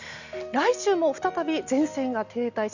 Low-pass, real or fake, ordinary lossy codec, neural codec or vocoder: 7.2 kHz; real; none; none